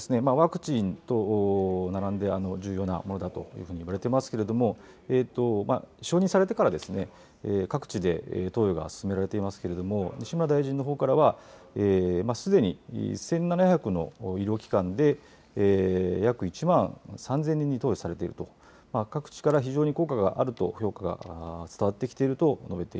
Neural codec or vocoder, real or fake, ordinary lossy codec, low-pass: none; real; none; none